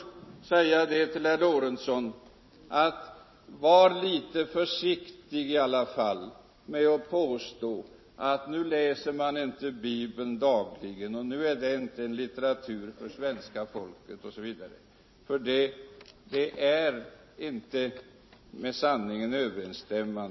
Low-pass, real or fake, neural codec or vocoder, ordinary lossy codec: 7.2 kHz; real; none; MP3, 24 kbps